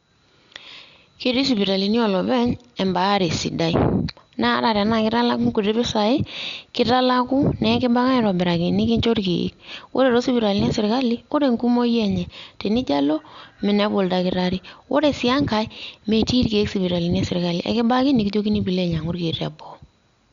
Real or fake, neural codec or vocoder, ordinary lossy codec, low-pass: real; none; Opus, 64 kbps; 7.2 kHz